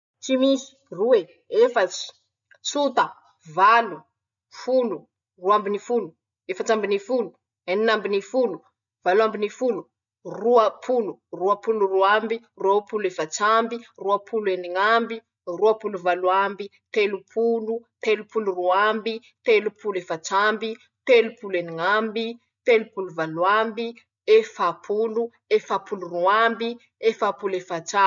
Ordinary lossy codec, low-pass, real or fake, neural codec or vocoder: none; 7.2 kHz; real; none